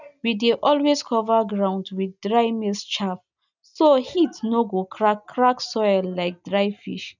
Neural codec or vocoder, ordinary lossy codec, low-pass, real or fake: none; none; 7.2 kHz; real